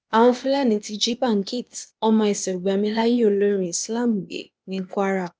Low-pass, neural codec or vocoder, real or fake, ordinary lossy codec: none; codec, 16 kHz, 0.8 kbps, ZipCodec; fake; none